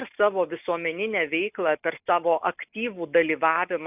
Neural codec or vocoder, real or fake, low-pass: none; real; 3.6 kHz